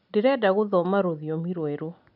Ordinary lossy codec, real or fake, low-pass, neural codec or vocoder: none; real; 5.4 kHz; none